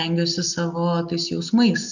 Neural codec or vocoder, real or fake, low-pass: none; real; 7.2 kHz